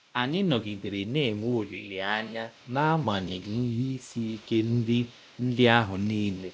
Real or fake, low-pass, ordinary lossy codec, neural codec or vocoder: fake; none; none; codec, 16 kHz, 1 kbps, X-Codec, WavLM features, trained on Multilingual LibriSpeech